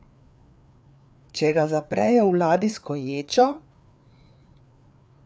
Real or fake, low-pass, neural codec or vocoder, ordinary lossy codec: fake; none; codec, 16 kHz, 4 kbps, FreqCodec, larger model; none